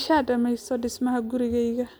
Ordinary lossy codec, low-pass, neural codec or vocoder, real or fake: none; none; none; real